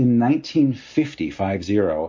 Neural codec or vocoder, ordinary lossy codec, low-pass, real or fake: none; MP3, 32 kbps; 7.2 kHz; real